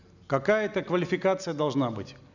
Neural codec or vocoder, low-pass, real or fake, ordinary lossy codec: none; 7.2 kHz; real; none